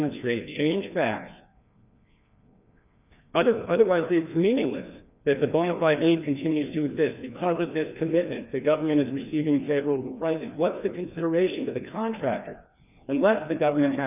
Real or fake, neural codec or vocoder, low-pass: fake; codec, 16 kHz, 2 kbps, FreqCodec, larger model; 3.6 kHz